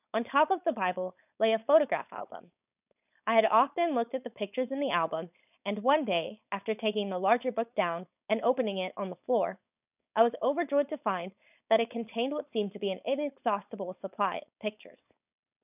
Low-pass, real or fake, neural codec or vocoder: 3.6 kHz; fake; codec, 16 kHz, 4.8 kbps, FACodec